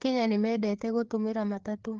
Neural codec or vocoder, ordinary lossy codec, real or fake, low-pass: codec, 16 kHz, 4 kbps, FreqCodec, larger model; Opus, 16 kbps; fake; 7.2 kHz